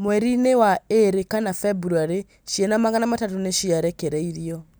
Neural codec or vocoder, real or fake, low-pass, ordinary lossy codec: none; real; none; none